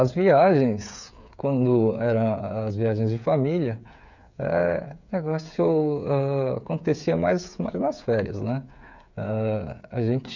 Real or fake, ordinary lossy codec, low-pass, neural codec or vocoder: fake; none; 7.2 kHz; codec, 16 kHz, 8 kbps, FreqCodec, smaller model